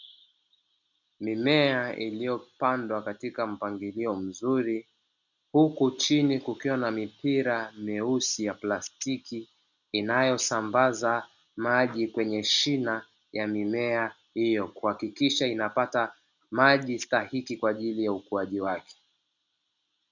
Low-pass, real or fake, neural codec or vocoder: 7.2 kHz; real; none